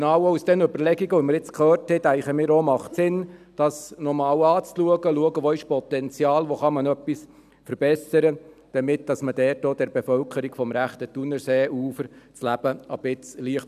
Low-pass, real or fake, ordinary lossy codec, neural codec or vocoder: 14.4 kHz; real; none; none